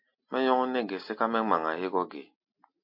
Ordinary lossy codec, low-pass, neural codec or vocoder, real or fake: MP3, 32 kbps; 5.4 kHz; none; real